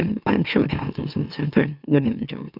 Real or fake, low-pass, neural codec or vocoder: fake; 5.4 kHz; autoencoder, 44.1 kHz, a latent of 192 numbers a frame, MeloTTS